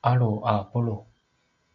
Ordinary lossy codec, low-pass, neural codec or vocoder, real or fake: Opus, 64 kbps; 7.2 kHz; none; real